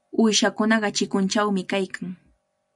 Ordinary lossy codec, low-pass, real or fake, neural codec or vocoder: MP3, 96 kbps; 10.8 kHz; real; none